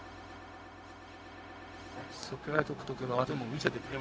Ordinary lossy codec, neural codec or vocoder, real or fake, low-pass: none; codec, 16 kHz, 0.4 kbps, LongCat-Audio-Codec; fake; none